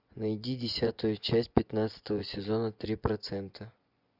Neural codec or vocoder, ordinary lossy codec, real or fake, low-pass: vocoder, 24 kHz, 100 mel bands, Vocos; Opus, 64 kbps; fake; 5.4 kHz